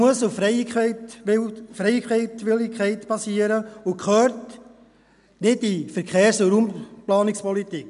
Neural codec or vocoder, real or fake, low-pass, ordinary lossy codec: none; real; 10.8 kHz; none